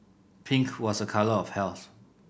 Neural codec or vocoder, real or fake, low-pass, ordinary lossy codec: none; real; none; none